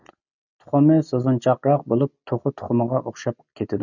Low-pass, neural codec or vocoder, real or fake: 7.2 kHz; none; real